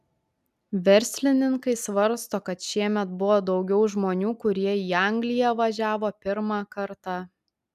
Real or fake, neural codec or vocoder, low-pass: real; none; 14.4 kHz